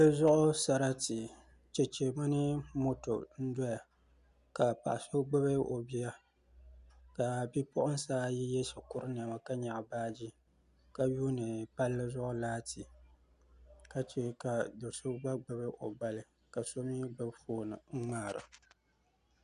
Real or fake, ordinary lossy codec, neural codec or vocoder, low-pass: real; Opus, 64 kbps; none; 10.8 kHz